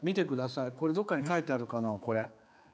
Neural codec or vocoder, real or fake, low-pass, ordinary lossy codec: codec, 16 kHz, 4 kbps, X-Codec, HuBERT features, trained on general audio; fake; none; none